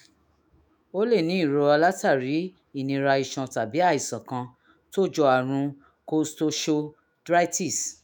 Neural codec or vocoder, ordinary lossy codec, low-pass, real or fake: autoencoder, 48 kHz, 128 numbers a frame, DAC-VAE, trained on Japanese speech; none; none; fake